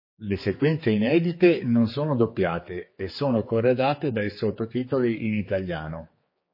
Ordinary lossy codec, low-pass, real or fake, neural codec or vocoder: MP3, 24 kbps; 5.4 kHz; fake; codec, 16 kHz, 4 kbps, X-Codec, HuBERT features, trained on general audio